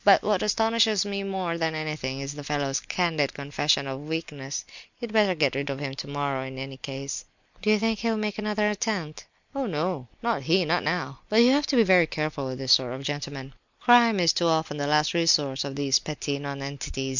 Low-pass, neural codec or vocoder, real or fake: 7.2 kHz; none; real